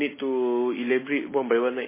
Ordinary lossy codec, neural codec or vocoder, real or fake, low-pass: MP3, 16 kbps; none; real; 3.6 kHz